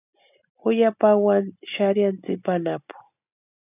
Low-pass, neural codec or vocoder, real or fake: 3.6 kHz; none; real